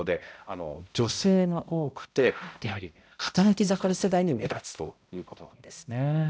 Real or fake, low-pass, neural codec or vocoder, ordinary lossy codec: fake; none; codec, 16 kHz, 0.5 kbps, X-Codec, HuBERT features, trained on balanced general audio; none